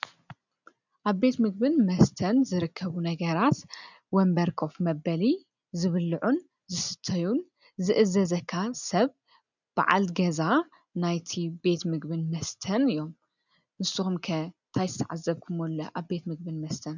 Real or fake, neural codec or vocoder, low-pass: real; none; 7.2 kHz